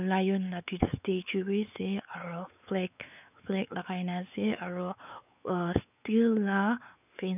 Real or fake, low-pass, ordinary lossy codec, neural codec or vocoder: fake; 3.6 kHz; none; codec, 16 kHz, 4 kbps, X-Codec, WavLM features, trained on Multilingual LibriSpeech